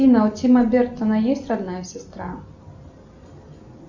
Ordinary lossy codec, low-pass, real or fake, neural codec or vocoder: Opus, 64 kbps; 7.2 kHz; real; none